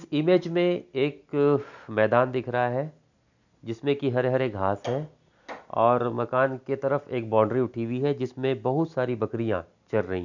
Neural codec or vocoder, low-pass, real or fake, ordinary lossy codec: none; 7.2 kHz; real; none